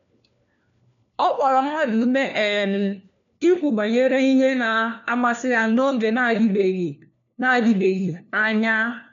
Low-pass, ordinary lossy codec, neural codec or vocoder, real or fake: 7.2 kHz; MP3, 96 kbps; codec, 16 kHz, 1 kbps, FunCodec, trained on LibriTTS, 50 frames a second; fake